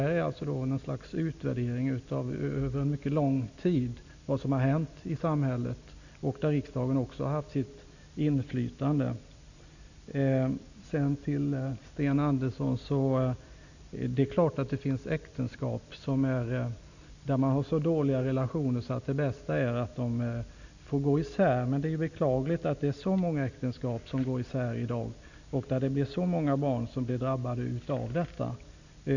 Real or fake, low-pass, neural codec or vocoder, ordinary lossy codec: real; 7.2 kHz; none; none